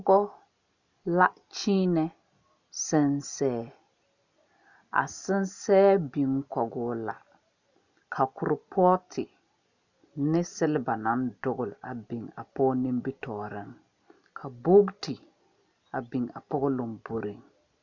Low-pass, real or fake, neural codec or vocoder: 7.2 kHz; real; none